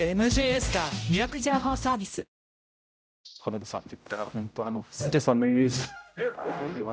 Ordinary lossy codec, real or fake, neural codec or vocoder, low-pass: none; fake; codec, 16 kHz, 0.5 kbps, X-Codec, HuBERT features, trained on general audio; none